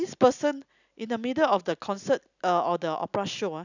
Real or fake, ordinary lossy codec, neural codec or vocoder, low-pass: real; none; none; 7.2 kHz